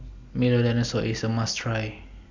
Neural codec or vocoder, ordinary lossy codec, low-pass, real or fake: none; MP3, 64 kbps; 7.2 kHz; real